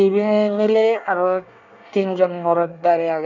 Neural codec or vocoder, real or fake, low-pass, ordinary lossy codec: codec, 24 kHz, 1 kbps, SNAC; fake; 7.2 kHz; none